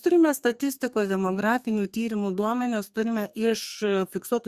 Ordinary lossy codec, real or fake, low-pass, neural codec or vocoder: Opus, 64 kbps; fake; 14.4 kHz; codec, 32 kHz, 1.9 kbps, SNAC